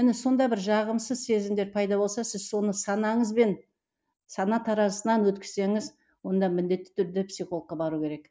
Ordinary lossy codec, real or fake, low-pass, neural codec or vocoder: none; real; none; none